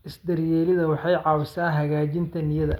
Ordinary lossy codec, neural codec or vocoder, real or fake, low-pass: none; none; real; 19.8 kHz